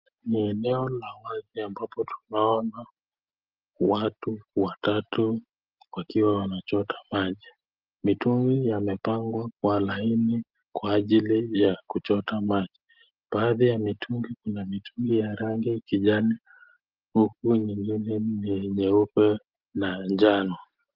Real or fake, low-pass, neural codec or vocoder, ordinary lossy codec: real; 5.4 kHz; none; Opus, 32 kbps